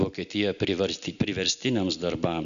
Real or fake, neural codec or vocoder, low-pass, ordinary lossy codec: real; none; 7.2 kHz; MP3, 96 kbps